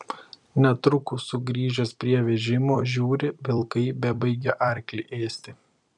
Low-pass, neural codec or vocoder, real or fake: 10.8 kHz; vocoder, 44.1 kHz, 128 mel bands every 256 samples, BigVGAN v2; fake